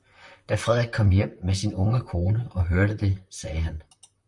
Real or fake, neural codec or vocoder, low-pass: fake; vocoder, 44.1 kHz, 128 mel bands, Pupu-Vocoder; 10.8 kHz